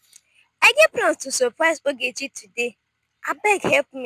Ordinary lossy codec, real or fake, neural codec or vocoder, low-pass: none; real; none; 14.4 kHz